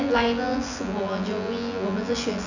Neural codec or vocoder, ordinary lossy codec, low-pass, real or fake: vocoder, 24 kHz, 100 mel bands, Vocos; none; 7.2 kHz; fake